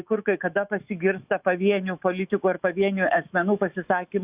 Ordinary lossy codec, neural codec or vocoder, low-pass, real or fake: Opus, 64 kbps; autoencoder, 48 kHz, 128 numbers a frame, DAC-VAE, trained on Japanese speech; 3.6 kHz; fake